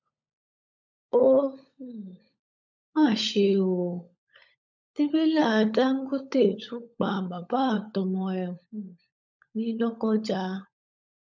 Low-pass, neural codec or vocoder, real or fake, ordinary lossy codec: 7.2 kHz; codec, 16 kHz, 16 kbps, FunCodec, trained on LibriTTS, 50 frames a second; fake; none